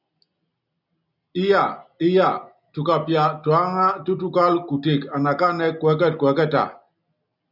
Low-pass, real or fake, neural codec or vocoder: 5.4 kHz; real; none